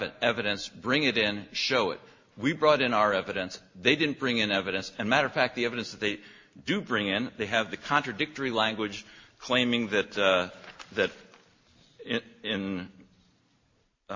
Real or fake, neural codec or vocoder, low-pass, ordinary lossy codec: real; none; 7.2 kHz; MP3, 32 kbps